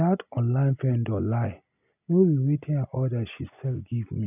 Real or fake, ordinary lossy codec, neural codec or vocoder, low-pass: real; none; none; 3.6 kHz